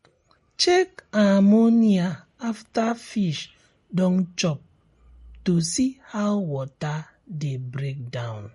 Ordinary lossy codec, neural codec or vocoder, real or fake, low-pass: MP3, 48 kbps; none; real; 19.8 kHz